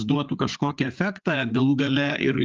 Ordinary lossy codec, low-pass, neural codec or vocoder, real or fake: Opus, 32 kbps; 7.2 kHz; codec, 16 kHz, 4 kbps, FreqCodec, larger model; fake